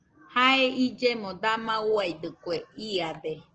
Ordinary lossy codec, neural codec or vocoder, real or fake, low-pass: Opus, 16 kbps; none; real; 7.2 kHz